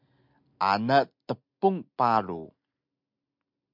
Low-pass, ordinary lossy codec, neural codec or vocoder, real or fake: 5.4 kHz; MP3, 48 kbps; none; real